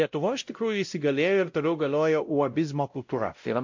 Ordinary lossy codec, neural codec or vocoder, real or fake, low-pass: MP3, 48 kbps; codec, 16 kHz, 0.5 kbps, X-Codec, WavLM features, trained on Multilingual LibriSpeech; fake; 7.2 kHz